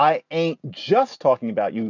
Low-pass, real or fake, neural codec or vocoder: 7.2 kHz; real; none